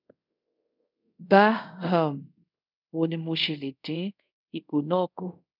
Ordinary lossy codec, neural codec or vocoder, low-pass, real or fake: none; codec, 24 kHz, 0.5 kbps, DualCodec; 5.4 kHz; fake